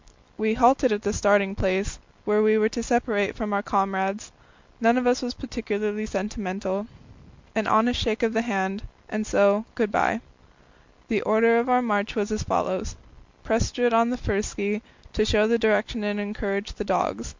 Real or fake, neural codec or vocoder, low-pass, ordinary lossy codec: real; none; 7.2 kHz; MP3, 64 kbps